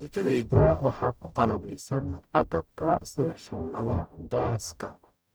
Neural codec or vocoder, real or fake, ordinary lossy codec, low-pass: codec, 44.1 kHz, 0.9 kbps, DAC; fake; none; none